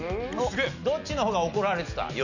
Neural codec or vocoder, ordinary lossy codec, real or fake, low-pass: none; none; real; 7.2 kHz